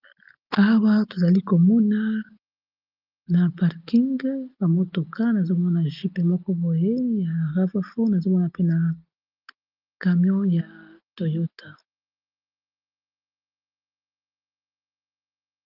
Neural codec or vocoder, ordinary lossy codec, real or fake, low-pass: none; Opus, 32 kbps; real; 5.4 kHz